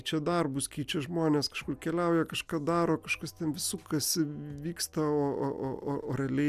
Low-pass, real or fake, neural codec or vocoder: 14.4 kHz; real; none